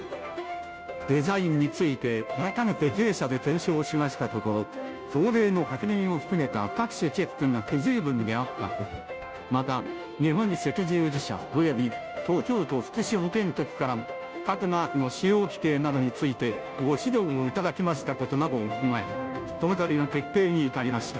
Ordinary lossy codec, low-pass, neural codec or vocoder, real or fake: none; none; codec, 16 kHz, 0.5 kbps, FunCodec, trained on Chinese and English, 25 frames a second; fake